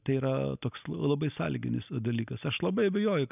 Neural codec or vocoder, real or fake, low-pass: none; real; 3.6 kHz